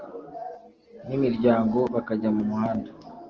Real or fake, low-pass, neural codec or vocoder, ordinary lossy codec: real; 7.2 kHz; none; Opus, 16 kbps